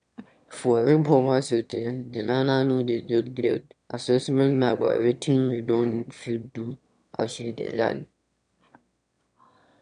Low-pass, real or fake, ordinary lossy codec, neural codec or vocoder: 9.9 kHz; fake; none; autoencoder, 22.05 kHz, a latent of 192 numbers a frame, VITS, trained on one speaker